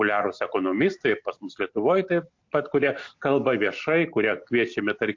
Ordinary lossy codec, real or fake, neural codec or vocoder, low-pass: MP3, 48 kbps; real; none; 7.2 kHz